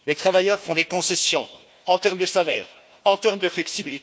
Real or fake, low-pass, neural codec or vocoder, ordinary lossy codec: fake; none; codec, 16 kHz, 1 kbps, FunCodec, trained on Chinese and English, 50 frames a second; none